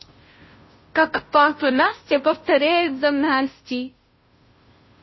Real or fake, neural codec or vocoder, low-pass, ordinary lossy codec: fake; codec, 16 kHz, 0.5 kbps, FunCodec, trained on Chinese and English, 25 frames a second; 7.2 kHz; MP3, 24 kbps